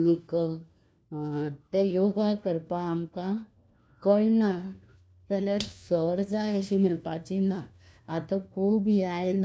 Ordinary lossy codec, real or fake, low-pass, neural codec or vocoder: none; fake; none; codec, 16 kHz, 1 kbps, FunCodec, trained on LibriTTS, 50 frames a second